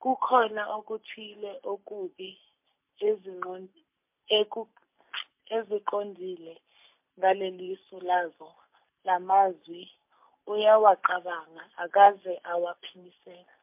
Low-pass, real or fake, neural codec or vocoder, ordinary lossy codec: 3.6 kHz; real; none; MP3, 32 kbps